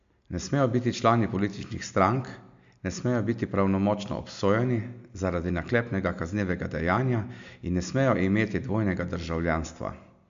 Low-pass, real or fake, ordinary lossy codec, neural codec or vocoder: 7.2 kHz; real; MP3, 64 kbps; none